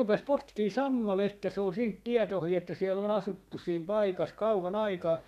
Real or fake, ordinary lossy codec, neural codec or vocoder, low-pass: fake; none; codec, 32 kHz, 1.9 kbps, SNAC; 14.4 kHz